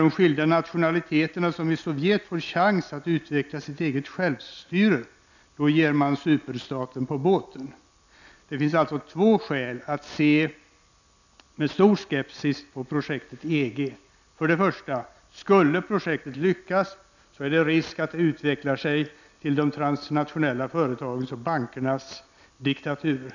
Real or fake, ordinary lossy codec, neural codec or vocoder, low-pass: real; none; none; 7.2 kHz